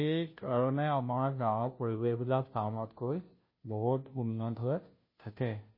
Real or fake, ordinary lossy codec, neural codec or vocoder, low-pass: fake; MP3, 24 kbps; codec, 16 kHz, 0.5 kbps, FunCodec, trained on Chinese and English, 25 frames a second; 5.4 kHz